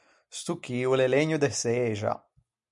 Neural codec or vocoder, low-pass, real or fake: none; 10.8 kHz; real